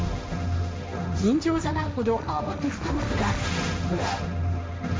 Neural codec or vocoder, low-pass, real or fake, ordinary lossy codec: codec, 16 kHz, 1.1 kbps, Voila-Tokenizer; none; fake; none